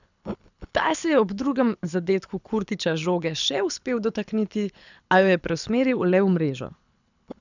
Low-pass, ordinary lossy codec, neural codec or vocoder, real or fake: 7.2 kHz; none; codec, 24 kHz, 6 kbps, HILCodec; fake